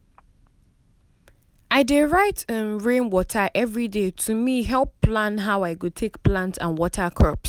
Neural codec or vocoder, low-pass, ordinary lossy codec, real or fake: none; 19.8 kHz; none; real